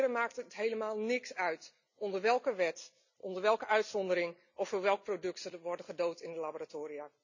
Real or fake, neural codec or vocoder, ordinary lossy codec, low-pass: real; none; none; 7.2 kHz